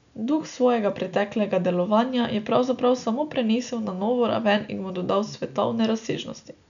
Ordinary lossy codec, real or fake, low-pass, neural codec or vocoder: none; real; 7.2 kHz; none